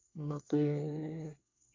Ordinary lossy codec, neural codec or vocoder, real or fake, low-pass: MP3, 64 kbps; codec, 24 kHz, 1 kbps, SNAC; fake; 7.2 kHz